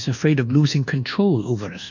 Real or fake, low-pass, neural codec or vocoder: fake; 7.2 kHz; codec, 24 kHz, 1.2 kbps, DualCodec